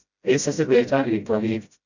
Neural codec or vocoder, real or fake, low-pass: codec, 16 kHz, 0.5 kbps, FreqCodec, smaller model; fake; 7.2 kHz